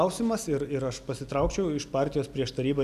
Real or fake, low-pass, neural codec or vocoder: real; 14.4 kHz; none